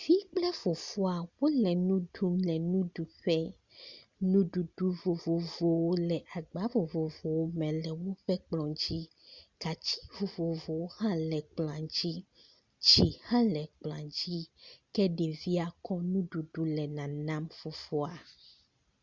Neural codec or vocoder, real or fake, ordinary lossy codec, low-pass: none; real; Opus, 64 kbps; 7.2 kHz